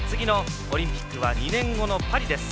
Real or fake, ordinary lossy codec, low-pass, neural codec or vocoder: real; none; none; none